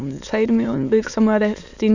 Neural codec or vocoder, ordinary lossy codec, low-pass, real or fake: autoencoder, 22.05 kHz, a latent of 192 numbers a frame, VITS, trained on many speakers; none; 7.2 kHz; fake